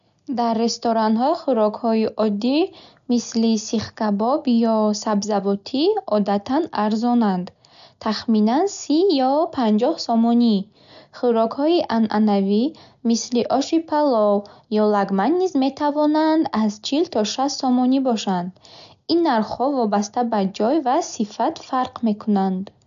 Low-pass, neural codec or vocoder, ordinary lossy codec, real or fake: 7.2 kHz; none; none; real